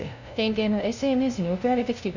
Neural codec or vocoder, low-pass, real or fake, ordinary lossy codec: codec, 16 kHz, 0.5 kbps, FunCodec, trained on LibriTTS, 25 frames a second; 7.2 kHz; fake; none